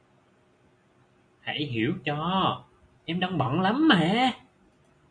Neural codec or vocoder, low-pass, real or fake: none; 9.9 kHz; real